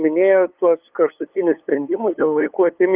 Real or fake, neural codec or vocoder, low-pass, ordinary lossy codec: fake; codec, 16 kHz, 8 kbps, FunCodec, trained on LibriTTS, 25 frames a second; 3.6 kHz; Opus, 32 kbps